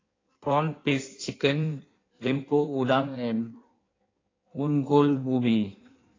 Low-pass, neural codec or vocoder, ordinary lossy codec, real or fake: 7.2 kHz; codec, 16 kHz in and 24 kHz out, 1.1 kbps, FireRedTTS-2 codec; AAC, 32 kbps; fake